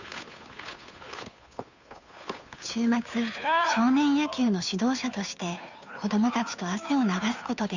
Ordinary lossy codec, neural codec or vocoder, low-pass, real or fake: none; codec, 16 kHz, 2 kbps, FunCodec, trained on Chinese and English, 25 frames a second; 7.2 kHz; fake